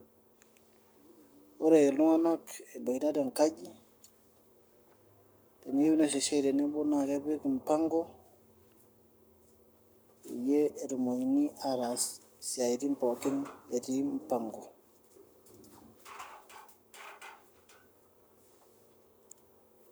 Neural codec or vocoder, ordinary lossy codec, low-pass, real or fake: codec, 44.1 kHz, 7.8 kbps, Pupu-Codec; none; none; fake